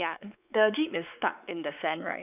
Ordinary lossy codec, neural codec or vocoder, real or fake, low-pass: none; codec, 16 kHz, 4 kbps, X-Codec, WavLM features, trained on Multilingual LibriSpeech; fake; 3.6 kHz